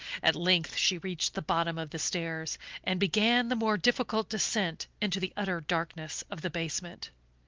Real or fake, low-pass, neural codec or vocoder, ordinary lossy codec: real; 7.2 kHz; none; Opus, 32 kbps